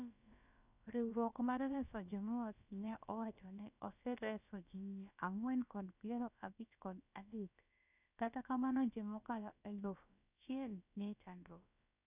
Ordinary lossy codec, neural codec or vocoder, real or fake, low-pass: none; codec, 16 kHz, about 1 kbps, DyCAST, with the encoder's durations; fake; 3.6 kHz